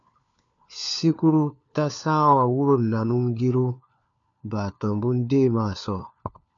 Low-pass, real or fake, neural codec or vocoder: 7.2 kHz; fake; codec, 16 kHz, 4 kbps, FunCodec, trained on LibriTTS, 50 frames a second